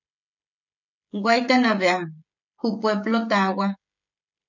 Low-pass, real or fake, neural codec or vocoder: 7.2 kHz; fake; codec, 16 kHz, 16 kbps, FreqCodec, smaller model